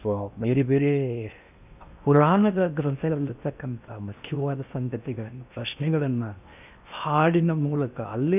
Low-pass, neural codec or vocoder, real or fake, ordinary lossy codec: 3.6 kHz; codec, 16 kHz in and 24 kHz out, 0.6 kbps, FocalCodec, streaming, 4096 codes; fake; none